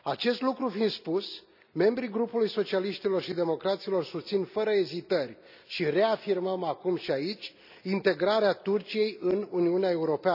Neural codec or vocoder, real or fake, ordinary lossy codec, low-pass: none; real; none; 5.4 kHz